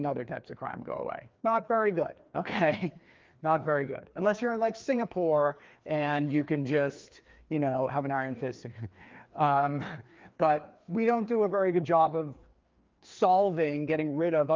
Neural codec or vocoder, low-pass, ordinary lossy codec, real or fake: codec, 16 kHz, 2 kbps, FreqCodec, larger model; 7.2 kHz; Opus, 32 kbps; fake